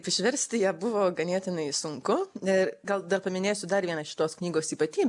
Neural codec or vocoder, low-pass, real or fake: none; 10.8 kHz; real